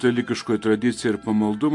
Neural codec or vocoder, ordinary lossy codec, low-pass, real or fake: none; MP3, 48 kbps; 10.8 kHz; real